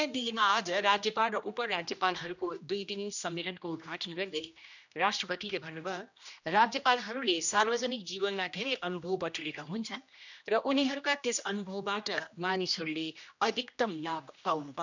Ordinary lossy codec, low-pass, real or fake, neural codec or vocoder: none; 7.2 kHz; fake; codec, 16 kHz, 1 kbps, X-Codec, HuBERT features, trained on general audio